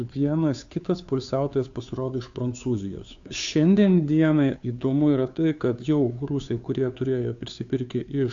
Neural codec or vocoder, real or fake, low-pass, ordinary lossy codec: codec, 16 kHz, 2 kbps, FunCodec, trained on Chinese and English, 25 frames a second; fake; 7.2 kHz; AAC, 64 kbps